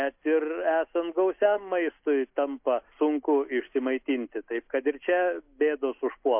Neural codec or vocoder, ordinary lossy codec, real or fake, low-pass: none; MP3, 32 kbps; real; 3.6 kHz